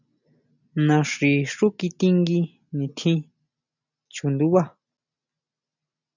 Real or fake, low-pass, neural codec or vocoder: real; 7.2 kHz; none